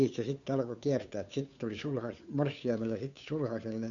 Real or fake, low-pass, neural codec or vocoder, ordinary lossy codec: fake; 7.2 kHz; codec, 16 kHz, 2 kbps, FunCodec, trained on Chinese and English, 25 frames a second; none